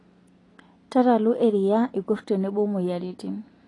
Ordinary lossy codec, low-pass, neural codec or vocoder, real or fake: AAC, 32 kbps; 10.8 kHz; none; real